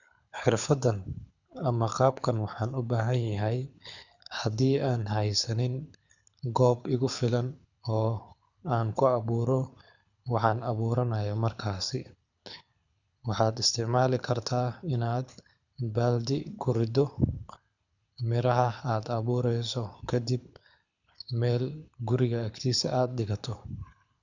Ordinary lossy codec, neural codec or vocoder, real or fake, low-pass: none; codec, 24 kHz, 6 kbps, HILCodec; fake; 7.2 kHz